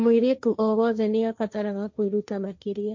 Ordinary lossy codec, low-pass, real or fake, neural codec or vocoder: MP3, 48 kbps; 7.2 kHz; fake; codec, 16 kHz, 1.1 kbps, Voila-Tokenizer